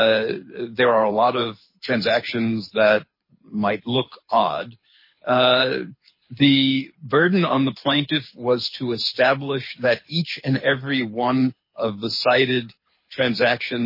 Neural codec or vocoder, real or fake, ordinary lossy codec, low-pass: codec, 24 kHz, 6 kbps, HILCodec; fake; MP3, 24 kbps; 5.4 kHz